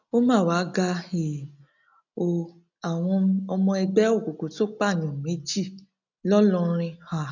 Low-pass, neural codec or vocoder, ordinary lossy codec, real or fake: 7.2 kHz; none; none; real